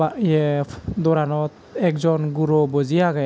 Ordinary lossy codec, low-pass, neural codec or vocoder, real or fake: none; none; none; real